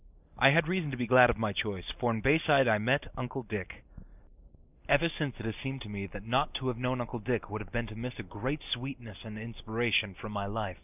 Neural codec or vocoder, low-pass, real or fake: none; 3.6 kHz; real